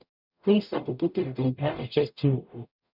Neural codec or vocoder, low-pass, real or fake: codec, 44.1 kHz, 0.9 kbps, DAC; 5.4 kHz; fake